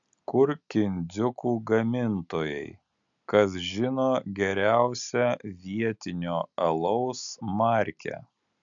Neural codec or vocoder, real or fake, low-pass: none; real; 7.2 kHz